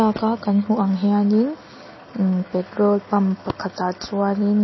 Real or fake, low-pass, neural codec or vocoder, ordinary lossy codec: real; 7.2 kHz; none; MP3, 24 kbps